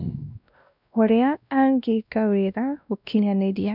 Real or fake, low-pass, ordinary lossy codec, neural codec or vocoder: fake; 5.4 kHz; none; codec, 16 kHz, 1 kbps, X-Codec, WavLM features, trained on Multilingual LibriSpeech